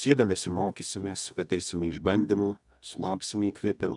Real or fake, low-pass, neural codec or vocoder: fake; 10.8 kHz; codec, 24 kHz, 0.9 kbps, WavTokenizer, medium music audio release